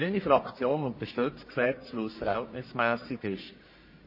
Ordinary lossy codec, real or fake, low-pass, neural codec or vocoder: MP3, 24 kbps; fake; 5.4 kHz; codec, 44.1 kHz, 1.7 kbps, Pupu-Codec